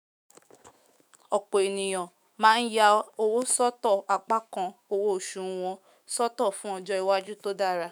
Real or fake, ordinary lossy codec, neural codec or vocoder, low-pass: fake; none; autoencoder, 48 kHz, 128 numbers a frame, DAC-VAE, trained on Japanese speech; none